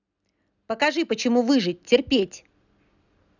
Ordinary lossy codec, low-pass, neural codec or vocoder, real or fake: none; 7.2 kHz; none; real